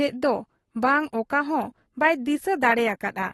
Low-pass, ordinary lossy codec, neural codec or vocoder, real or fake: 19.8 kHz; AAC, 32 kbps; codec, 44.1 kHz, 7.8 kbps, Pupu-Codec; fake